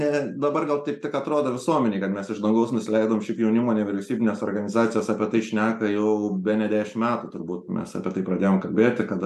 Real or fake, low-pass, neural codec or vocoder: real; 14.4 kHz; none